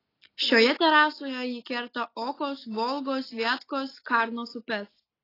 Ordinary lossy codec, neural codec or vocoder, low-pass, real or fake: AAC, 24 kbps; none; 5.4 kHz; real